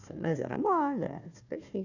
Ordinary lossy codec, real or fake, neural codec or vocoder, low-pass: none; fake; codec, 16 kHz, 1 kbps, FunCodec, trained on Chinese and English, 50 frames a second; 7.2 kHz